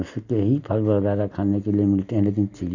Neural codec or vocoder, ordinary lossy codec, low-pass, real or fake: none; none; 7.2 kHz; real